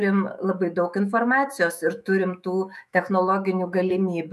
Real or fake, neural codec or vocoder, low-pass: fake; autoencoder, 48 kHz, 128 numbers a frame, DAC-VAE, trained on Japanese speech; 14.4 kHz